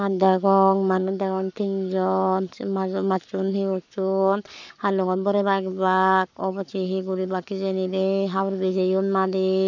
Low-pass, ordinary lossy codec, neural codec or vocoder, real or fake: 7.2 kHz; none; codec, 44.1 kHz, 7.8 kbps, Pupu-Codec; fake